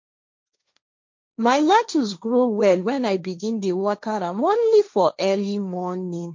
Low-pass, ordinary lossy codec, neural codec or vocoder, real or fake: 7.2 kHz; MP3, 48 kbps; codec, 16 kHz, 1.1 kbps, Voila-Tokenizer; fake